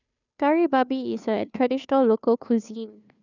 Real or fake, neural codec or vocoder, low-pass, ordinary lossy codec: fake; codec, 16 kHz, 2 kbps, FunCodec, trained on Chinese and English, 25 frames a second; 7.2 kHz; none